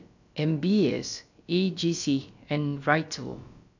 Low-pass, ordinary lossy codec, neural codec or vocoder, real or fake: 7.2 kHz; none; codec, 16 kHz, about 1 kbps, DyCAST, with the encoder's durations; fake